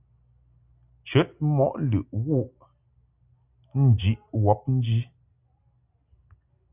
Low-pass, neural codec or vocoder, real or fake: 3.6 kHz; none; real